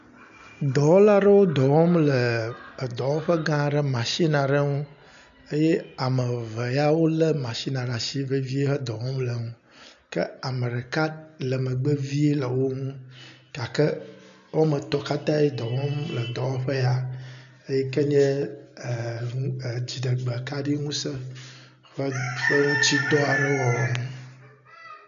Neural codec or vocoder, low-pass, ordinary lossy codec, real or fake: none; 7.2 kHz; MP3, 96 kbps; real